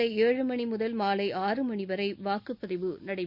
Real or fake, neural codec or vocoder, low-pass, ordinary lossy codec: real; none; 5.4 kHz; Opus, 64 kbps